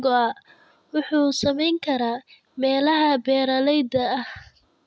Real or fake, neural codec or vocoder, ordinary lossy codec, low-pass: real; none; none; none